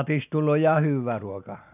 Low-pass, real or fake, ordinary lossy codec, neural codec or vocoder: 3.6 kHz; real; none; none